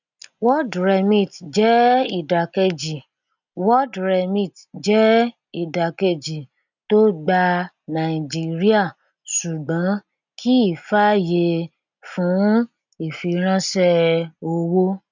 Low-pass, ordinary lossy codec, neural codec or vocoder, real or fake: 7.2 kHz; none; none; real